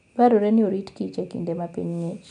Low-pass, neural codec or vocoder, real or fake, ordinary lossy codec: 9.9 kHz; none; real; none